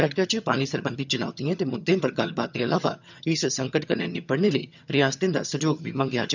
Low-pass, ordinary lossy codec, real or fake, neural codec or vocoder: 7.2 kHz; none; fake; vocoder, 22.05 kHz, 80 mel bands, HiFi-GAN